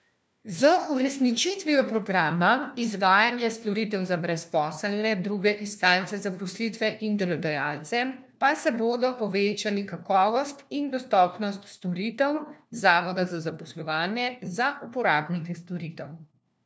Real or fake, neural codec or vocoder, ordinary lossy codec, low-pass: fake; codec, 16 kHz, 1 kbps, FunCodec, trained on LibriTTS, 50 frames a second; none; none